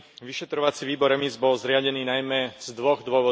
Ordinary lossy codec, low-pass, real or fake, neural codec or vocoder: none; none; real; none